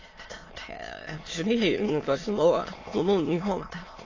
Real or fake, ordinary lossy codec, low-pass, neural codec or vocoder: fake; AAC, 32 kbps; 7.2 kHz; autoencoder, 22.05 kHz, a latent of 192 numbers a frame, VITS, trained on many speakers